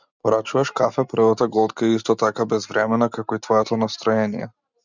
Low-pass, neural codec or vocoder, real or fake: 7.2 kHz; none; real